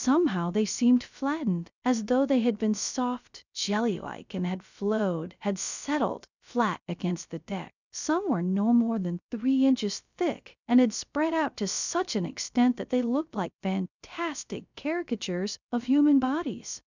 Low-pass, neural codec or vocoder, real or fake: 7.2 kHz; codec, 16 kHz, 0.3 kbps, FocalCodec; fake